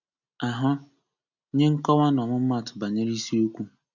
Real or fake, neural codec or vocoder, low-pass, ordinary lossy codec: real; none; 7.2 kHz; none